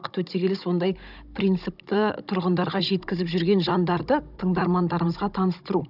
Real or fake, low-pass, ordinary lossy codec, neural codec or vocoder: fake; 5.4 kHz; none; codec, 16 kHz, 16 kbps, FunCodec, trained on Chinese and English, 50 frames a second